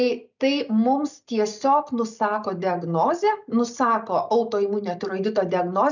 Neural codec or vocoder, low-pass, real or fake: none; 7.2 kHz; real